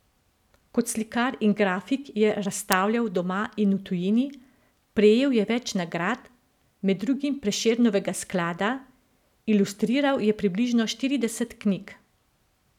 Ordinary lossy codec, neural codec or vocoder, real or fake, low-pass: none; none; real; 19.8 kHz